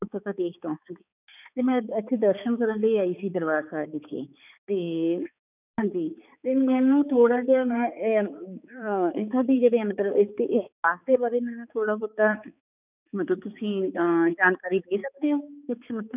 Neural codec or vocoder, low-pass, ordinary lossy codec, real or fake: codec, 16 kHz, 4 kbps, X-Codec, HuBERT features, trained on balanced general audio; 3.6 kHz; none; fake